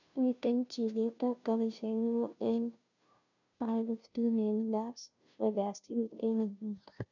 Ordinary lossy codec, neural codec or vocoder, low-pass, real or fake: none; codec, 16 kHz, 0.5 kbps, FunCodec, trained on Chinese and English, 25 frames a second; 7.2 kHz; fake